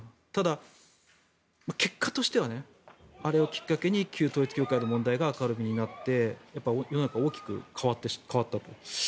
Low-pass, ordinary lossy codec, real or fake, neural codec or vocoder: none; none; real; none